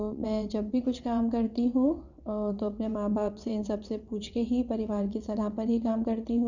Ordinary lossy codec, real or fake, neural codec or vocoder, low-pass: none; fake; vocoder, 22.05 kHz, 80 mel bands, WaveNeXt; 7.2 kHz